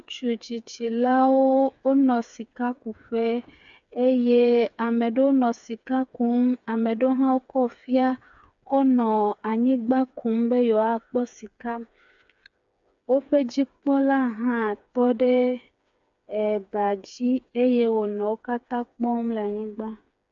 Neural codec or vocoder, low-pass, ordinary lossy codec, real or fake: codec, 16 kHz, 4 kbps, FreqCodec, smaller model; 7.2 kHz; AAC, 64 kbps; fake